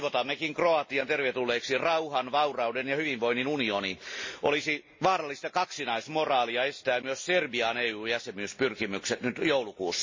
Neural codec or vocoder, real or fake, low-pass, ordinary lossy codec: none; real; 7.2 kHz; MP3, 32 kbps